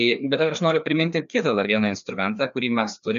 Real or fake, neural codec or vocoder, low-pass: fake; codec, 16 kHz, 2 kbps, FreqCodec, larger model; 7.2 kHz